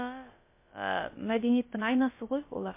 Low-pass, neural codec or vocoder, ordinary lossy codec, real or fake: 3.6 kHz; codec, 16 kHz, about 1 kbps, DyCAST, with the encoder's durations; MP3, 24 kbps; fake